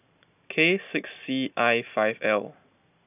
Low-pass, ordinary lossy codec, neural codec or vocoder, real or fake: 3.6 kHz; none; none; real